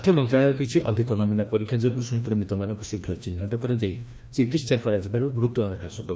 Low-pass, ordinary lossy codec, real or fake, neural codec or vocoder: none; none; fake; codec, 16 kHz, 1 kbps, FreqCodec, larger model